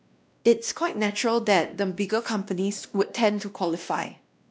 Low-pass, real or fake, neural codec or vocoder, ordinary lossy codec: none; fake; codec, 16 kHz, 1 kbps, X-Codec, WavLM features, trained on Multilingual LibriSpeech; none